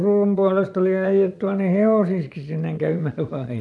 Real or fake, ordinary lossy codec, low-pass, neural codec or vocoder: fake; none; none; vocoder, 22.05 kHz, 80 mel bands, Vocos